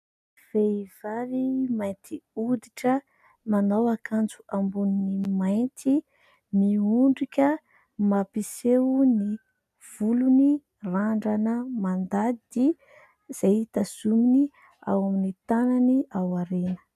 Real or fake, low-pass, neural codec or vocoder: real; 14.4 kHz; none